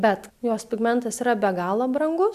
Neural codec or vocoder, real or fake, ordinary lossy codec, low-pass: none; real; MP3, 96 kbps; 14.4 kHz